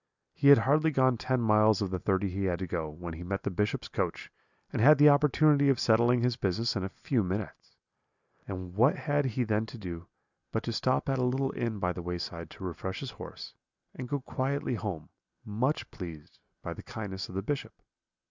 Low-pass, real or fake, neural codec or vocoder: 7.2 kHz; real; none